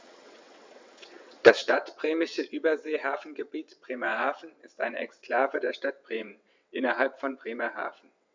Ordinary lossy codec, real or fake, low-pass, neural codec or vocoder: MP3, 64 kbps; fake; 7.2 kHz; vocoder, 22.05 kHz, 80 mel bands, WaveNeXt